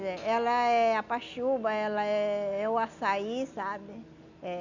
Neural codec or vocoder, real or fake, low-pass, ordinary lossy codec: none; real; 7.2 kHz; none